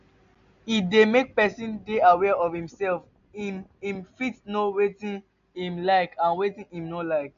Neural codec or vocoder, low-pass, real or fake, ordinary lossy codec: none; 7.2 kHz; real; AAC, 96 kbps